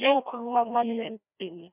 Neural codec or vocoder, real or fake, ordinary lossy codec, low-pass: codec, 16 kHz, 1 kbps, FreqCodec, larger model; fake; none; 3.6 kHz